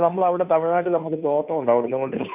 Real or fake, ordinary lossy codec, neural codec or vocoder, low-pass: fake; none; codec, 16 kHz, 2 kbps, FunCodec, trained on Chinese and English, 25 frames a second; 3.6 kHz